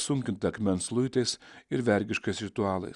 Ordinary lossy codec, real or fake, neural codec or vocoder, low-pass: Opus, 64 kbps; real; none; 10.8 kHz